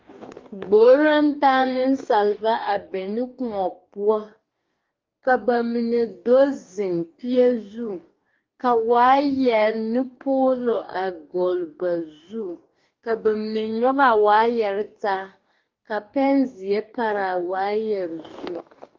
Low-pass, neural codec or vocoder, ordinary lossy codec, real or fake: 7.2 kHz; codec, 44.1 kHz, 2.6 kbps, DAC; Opus, 24 kbps; fake